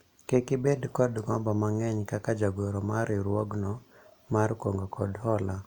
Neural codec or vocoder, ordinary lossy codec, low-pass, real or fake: none; Opus, 64 kbps; 19.8 kHz; real